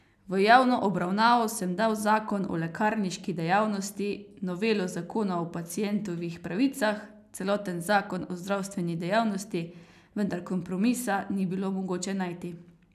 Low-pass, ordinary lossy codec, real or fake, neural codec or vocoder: 14.4 kHz; none; real; none